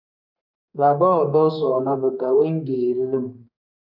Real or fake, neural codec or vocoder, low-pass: fake; codec, 32 kHz, 1.9 kbps, SNAC; 5.4 kHz